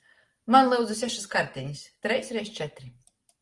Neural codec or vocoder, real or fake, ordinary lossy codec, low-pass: none; real; Opus, 24 kbps; 10.8 kHz